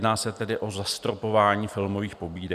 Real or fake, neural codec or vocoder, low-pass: real; none; 14.4 kHz